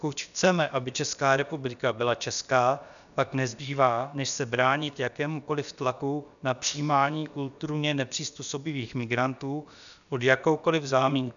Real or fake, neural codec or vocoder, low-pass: fake; codec, 16 kHz, about 1 kbps, DyCAST, with the encoder's durations; 7.2 kHz